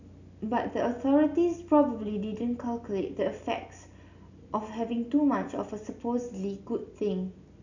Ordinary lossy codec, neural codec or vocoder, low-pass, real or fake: none; none; 7.2 kHz; real